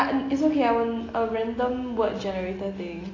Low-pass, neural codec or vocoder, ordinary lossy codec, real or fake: 7.2 kHz; none; AAC, 32 kbps; real